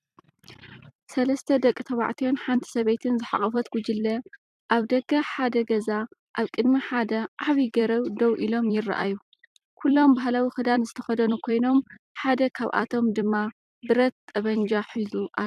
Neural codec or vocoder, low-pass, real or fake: vocoder, 44.1 kHz, 128 mel bands every 256 samples, BigVGAN v2; 14.4 kHz; fake